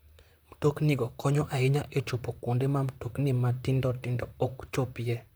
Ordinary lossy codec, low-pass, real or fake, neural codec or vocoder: none; none; fake; vocoder, 44.1 kHz, 128 mel bands, Pupu-Vocoder